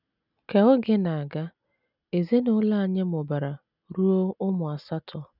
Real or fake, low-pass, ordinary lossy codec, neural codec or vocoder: real; 5.4 kHz; none; none